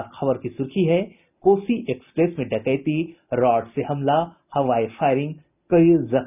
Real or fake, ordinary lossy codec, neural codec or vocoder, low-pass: real; none; none; 3.6 kHz